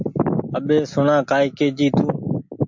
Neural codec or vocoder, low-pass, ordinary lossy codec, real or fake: none; 7.2 kHz; MP3, 48 kbps; real